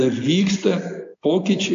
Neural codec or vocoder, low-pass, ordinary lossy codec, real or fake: none; 7.2 kHz; AAC, 64 kbps; real